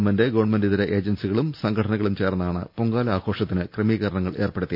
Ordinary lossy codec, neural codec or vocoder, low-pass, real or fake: none; none; 5.4 kHz; real